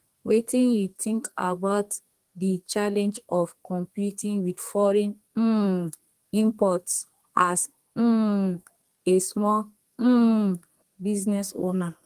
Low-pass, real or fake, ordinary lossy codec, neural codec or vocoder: 14.4 kHz; fake; Opus, 24 kbps; codec, 32 kHz, 1.9 kbps, SNAC